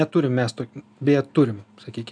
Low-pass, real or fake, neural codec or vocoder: 9.9 kHz; real; none